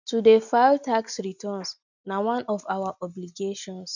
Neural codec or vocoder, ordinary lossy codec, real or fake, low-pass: none; none; real; 7.2 kHz